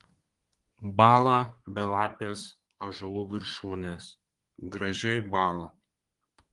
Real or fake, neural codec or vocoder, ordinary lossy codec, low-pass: fake; codec, 24 kHz, 1 kbps, SNAC; Opus, 24 kbps; 10.8 kHz